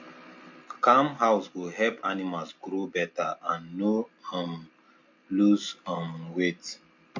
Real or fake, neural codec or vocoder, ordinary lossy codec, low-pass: real; none; MP3, 48 kbps; 7.2 kHz